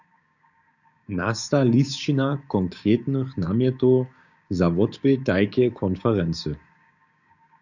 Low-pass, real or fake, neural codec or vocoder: 7.2 kHz; fake; codec, 16 kHz, 6 kbps, DAC